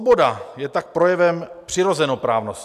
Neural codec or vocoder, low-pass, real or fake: none; 14.4 kHz; real